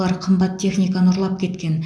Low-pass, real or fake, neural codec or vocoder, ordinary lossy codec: none; real; none; none